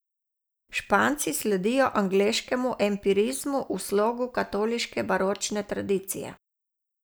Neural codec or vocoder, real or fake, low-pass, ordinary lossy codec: none; real; none; none